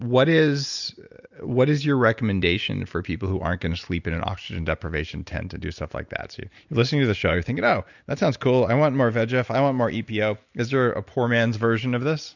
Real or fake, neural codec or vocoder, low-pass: real; none; 7.2 kHz